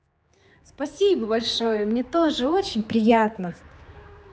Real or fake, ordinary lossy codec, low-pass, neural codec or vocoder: fake; none; none; codec, 16 kHz, 4 kbps, X-Codec, HuBERT features, trained on general audio